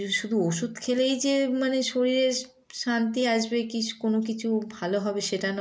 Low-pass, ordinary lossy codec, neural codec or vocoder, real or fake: none; none; none; real